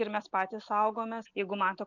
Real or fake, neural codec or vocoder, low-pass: real; none; 7.2 kHz